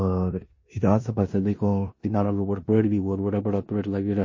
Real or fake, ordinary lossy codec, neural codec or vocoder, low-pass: fake; MP3, 32 kbps; codec, 16 kHz in and 24 kHz out, 0.9 kbps, LongCat-Audio-Codec, four codebook decoder; 7.2 kHz